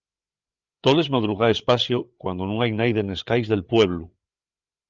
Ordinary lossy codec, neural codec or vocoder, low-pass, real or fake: Opus, 24 kbps; codec, 16 kHz, 8 kbps, FreqCodec, larger model; 7.2 kHz; fake